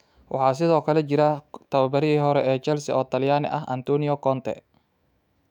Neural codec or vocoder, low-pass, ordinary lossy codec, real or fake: autoencoder, 48 kHz, 128 numbers a frame, DAC-VAE, trained on Japanese speech; 19.8 kHz; none; fake